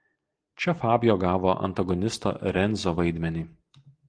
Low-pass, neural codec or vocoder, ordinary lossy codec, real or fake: 9.9 kHz; none; Opus, 24 kbps; real